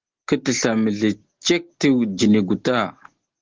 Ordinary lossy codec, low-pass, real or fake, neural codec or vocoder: Opus, 16 kbps; 7.2 kHz; real; none